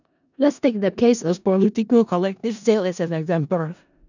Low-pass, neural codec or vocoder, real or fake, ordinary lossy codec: 7.2 kHz; codec, 16 kHz in and 24 kHz out, 0.4 kbps, LongCat-Audio-Codec, four codebook decoder; fake; none